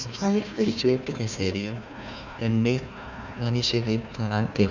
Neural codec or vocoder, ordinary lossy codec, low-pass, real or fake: codec, 16 kHz, 1 kbps, FunCodec, trained on Chinese and English, 50 frames a second; none; 7.2 kHz; fake